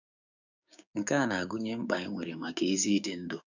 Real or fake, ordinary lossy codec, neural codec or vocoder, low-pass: fake; none; codec, 16 kHz, 6 kbps, DAC; 7.2 kHz